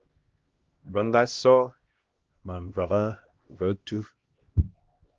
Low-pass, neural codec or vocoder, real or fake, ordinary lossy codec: 7.2 kHz; codec, 16 kHz, 1 kbps, X-Codec, HuBERT features, trained on LibriSpeech; fake; Opus, 16 kbps